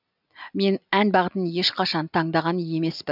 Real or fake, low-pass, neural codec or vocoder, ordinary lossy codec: real; 5.4 kHz; none; none